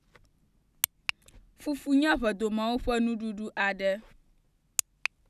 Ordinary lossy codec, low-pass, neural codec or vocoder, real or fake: none; 14.4 kHz; none; real